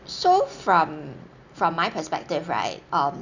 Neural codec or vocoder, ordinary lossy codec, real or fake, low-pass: none; none; real; 7.2 kHz